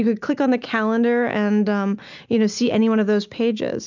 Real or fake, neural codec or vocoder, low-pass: real; none; 7.2 kHz